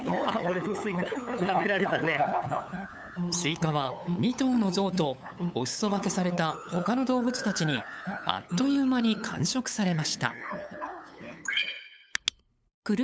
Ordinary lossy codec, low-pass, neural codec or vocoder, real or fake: none; none; codec, 16 kHz, 8 kbps, FunCodec, trained on LibriTTS, 25 frames a second; fake